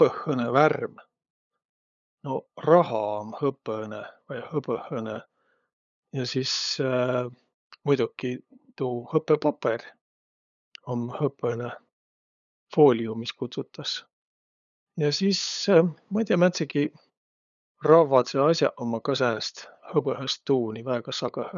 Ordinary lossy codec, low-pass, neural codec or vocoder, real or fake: none; 7.2 kHz; codec, 16 kHz, 8 kbps, FunCodec, trained on LibriTTS, 25 frames a second; fake